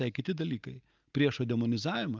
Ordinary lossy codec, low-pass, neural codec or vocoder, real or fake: Opus, 24 kbps; 7.2 kHz; none; real